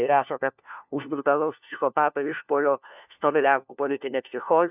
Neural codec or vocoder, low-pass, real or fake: codec, 16 kHz, 1 kbps, FunCodec, trained on LibriTTS, 50 frames a second; 3.6 kHz; fake